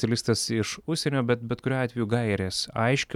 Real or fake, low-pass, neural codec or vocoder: real; 19.8 kHz; none